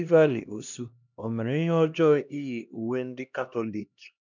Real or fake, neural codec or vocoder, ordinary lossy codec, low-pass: fake; codec, 16 kHz, 1 kbps, X-Codec, HuBERT features, trained on LibriSpeech; none; 7.2 kHz